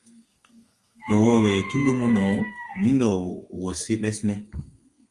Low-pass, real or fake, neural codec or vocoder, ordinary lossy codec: 10.8 kHz; fake; codec, 32 kHz, 1.9 kbps, SNAC; Opus, 32 kbps